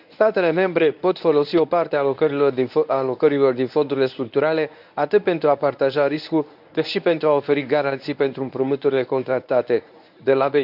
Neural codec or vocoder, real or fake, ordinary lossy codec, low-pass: codec, 24 kHz, 0.9 kbps, WavTokenizer, medium speech release version 1; fake; none; 5.4 kHz